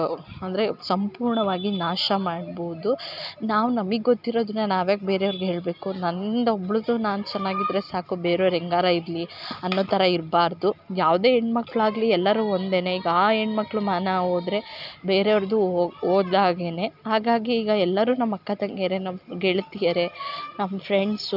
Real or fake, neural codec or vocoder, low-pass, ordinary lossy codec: real; none; 5.4 kHz; none